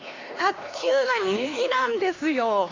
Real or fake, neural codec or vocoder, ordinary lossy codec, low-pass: fake; codec, 16 kHz, 2 kbps, X-Codec, HuBERT features, trained on LibriSpeech; AAC, 32 kbps; 7.2 kHz